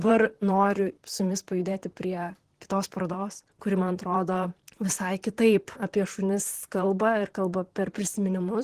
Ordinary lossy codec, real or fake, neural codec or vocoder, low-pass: Opus, 16 kbps; fake; vocoder, 44.1 kHz, 128 mel bands, Pupu-Vocoder; 14.4 kHz